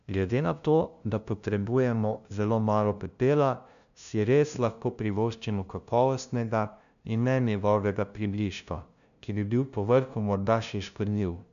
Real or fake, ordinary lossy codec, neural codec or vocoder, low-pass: fake; none; codec, 16 kHz, 0.5 kbps, FunCodec, trained on LibriTTS, 25 frames a second; 7.2 kHz